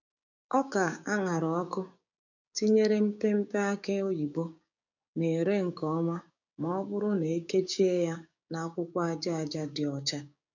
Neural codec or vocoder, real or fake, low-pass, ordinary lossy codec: codec, 16 kHz, 6 kbps, DAC; fake; 7.2 kHz; none